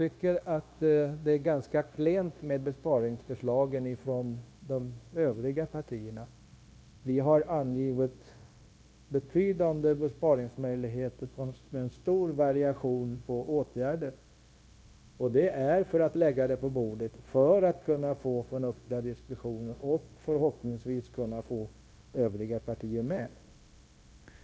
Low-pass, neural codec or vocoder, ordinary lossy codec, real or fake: none; codec, 16 kHz, 0.9 kbps, LongCat-Audio-Codec; none; fake